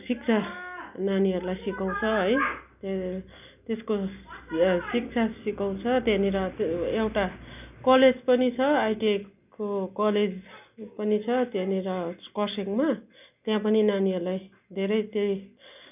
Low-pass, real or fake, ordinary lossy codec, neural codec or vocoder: 3.6 kHz; real; none; none